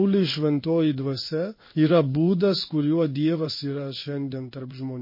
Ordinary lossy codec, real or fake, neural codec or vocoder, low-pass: MP3, 24 kbps; fake; codec, 16 kHz in and 24 kHz out, 1 kbps, XY-Tokenizer; 5.4 kHz